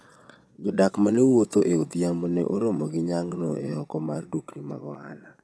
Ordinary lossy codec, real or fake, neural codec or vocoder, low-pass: none; fake; vocoder, 22.05 kHz, 80 mel bands, Vocos; none